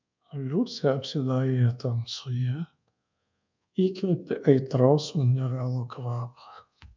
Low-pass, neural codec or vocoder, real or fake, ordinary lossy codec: 7.2 kHz; codec, 24 kHz, 1.2 kbps, DualCodec; fake; MP3, 64 kbps